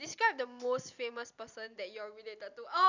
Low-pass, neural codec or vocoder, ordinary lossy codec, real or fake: 7.2 kHz; none; none; real